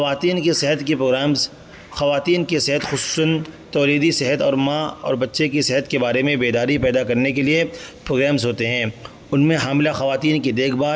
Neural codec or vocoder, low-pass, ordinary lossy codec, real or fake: none; none; none; real